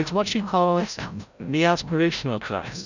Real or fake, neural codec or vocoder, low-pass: fake; codec, 16 kHz, 0.5 kbps, FreqCodec, larger model; 7.2 kHz